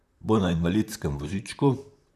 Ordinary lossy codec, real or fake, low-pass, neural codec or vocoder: none; fake; 14.4 kHz; vocoder, 44.1 kHz, 128 mel bands, Pupu-Vocoder